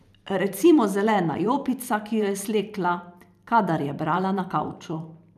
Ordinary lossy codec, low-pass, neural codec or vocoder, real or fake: none; 14.4 kHz; vocoder, 44.1 kHz, 128 mel bands every 512 samples, BigVGAN v2; fake